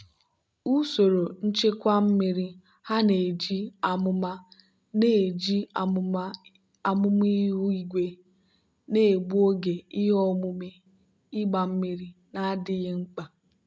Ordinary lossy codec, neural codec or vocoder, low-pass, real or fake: none; none; none; real